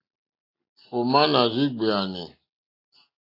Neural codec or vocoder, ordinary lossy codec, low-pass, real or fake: none; AAC, 32 kbps; 5.4 kHz; real